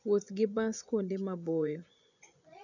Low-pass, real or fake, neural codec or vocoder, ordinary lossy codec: 7.2 kHz; fake; vocoder, 24 kHz, 100 mel bands, Vocos; none